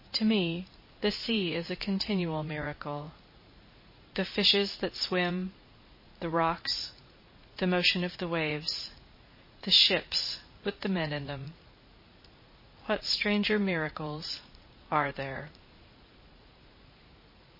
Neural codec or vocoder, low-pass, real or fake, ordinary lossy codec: vocoder, 44.1 kHz, 80 mel bands, Vocos; 5.4 kHz; fake; MP3, 24 kbps